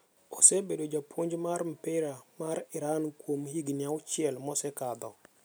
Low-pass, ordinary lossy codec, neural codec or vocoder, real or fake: none; none; none; real